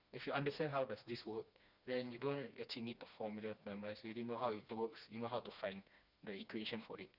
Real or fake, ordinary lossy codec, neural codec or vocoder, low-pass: fake; Opus, 64 kbps; codec, 16 kHz, 2 kbps, FreqCodec, smaller model; 5.4 kHz